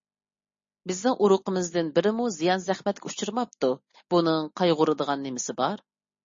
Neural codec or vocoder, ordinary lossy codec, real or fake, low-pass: none; MP3, 32 kbps; real; 7.2 kHz